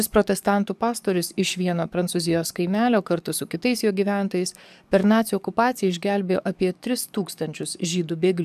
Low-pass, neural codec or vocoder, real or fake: 14.4 kHz; codec, 44.1 kHz, 7.8 kbps, DAC; fake